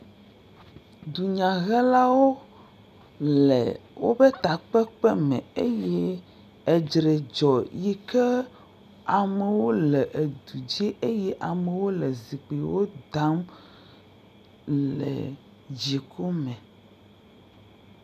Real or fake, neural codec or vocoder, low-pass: real; none; 14.4 kHz